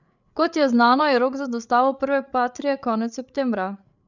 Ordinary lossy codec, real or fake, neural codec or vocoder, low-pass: none; fake; codec, 16 kHz, 8 kbps, FreqCodec, larger model; 7.2 kHz